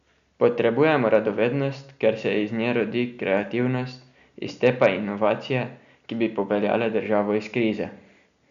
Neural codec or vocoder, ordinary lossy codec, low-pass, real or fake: none; none; 7.2 kHz; real